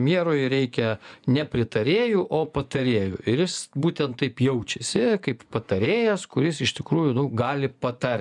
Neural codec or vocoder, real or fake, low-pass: vocoder, 24 kHz, 100 mel bands, Vocos; fake; 10.8 kHz